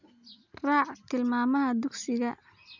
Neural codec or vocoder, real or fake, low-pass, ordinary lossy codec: none; real; 7.2 kHz; none